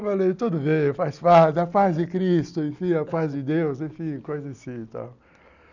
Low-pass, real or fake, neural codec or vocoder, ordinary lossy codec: 7.2 kHz; real; none; none